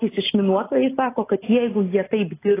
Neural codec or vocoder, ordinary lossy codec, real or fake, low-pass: none; AAC, 16 kbps; real; 3.6 kHz